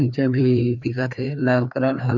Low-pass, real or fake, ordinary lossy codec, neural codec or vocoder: 7.2 kHz; fake; none; codec, 16 kHz, 2 kbps, FreqCodec, larger model